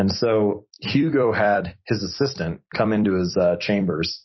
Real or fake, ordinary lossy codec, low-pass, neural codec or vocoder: fake; MP3, 24 kbps; 7.2 kHz; vocoder, 44.1 kHz, 128 mel bands every 256 samples, BigVGAN v2